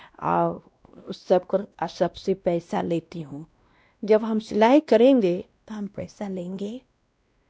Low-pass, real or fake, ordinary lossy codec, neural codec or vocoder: none; fake; none; codec, 16 kHz, 1 kbps, X-Codec, WavLM features, trained on Multilingual LibriSpeech